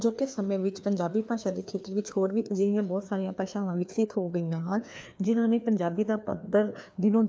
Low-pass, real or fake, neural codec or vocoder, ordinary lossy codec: none; fake; codec, 16 kHz, 2 kbps, FreqCodec, larger model; none